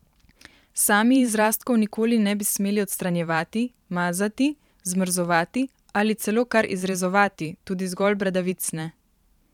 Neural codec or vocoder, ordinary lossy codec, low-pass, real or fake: vocoder, 44.1 kHz, 128 mel bands every 512 samples, BigVGAN v2; none; 19.8 kHz; fake